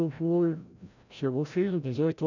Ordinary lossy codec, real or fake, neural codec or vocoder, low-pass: none; fake; codec, 16 kHz, 0.5 kbps, FreqCodec, larger model; 7.2 kHz